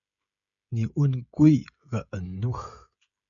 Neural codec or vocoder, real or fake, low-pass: codec, 16 kHz, 16 kbps, FreqCodec, smaller model; fake; 7.2 kHz